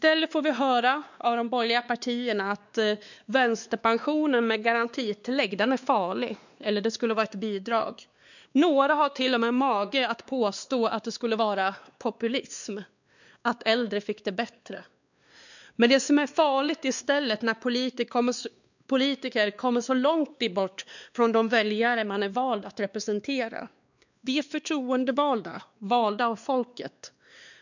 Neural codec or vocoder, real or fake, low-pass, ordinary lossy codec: codec, 16 kHz, 2 kbps, X-Codec, WavLM features, trained on Multilingual LibriSpeech; fake; 7.2 kHz; none